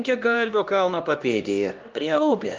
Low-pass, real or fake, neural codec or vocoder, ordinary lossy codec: 7.2 kHz; fake; codec, 16 kHz, 1 kbps, X-Codec, HuBERT features, trained on LibriSpeech; Opus, 24 kbps